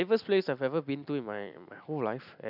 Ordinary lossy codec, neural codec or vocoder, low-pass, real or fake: none; none; 5.4 kHz; real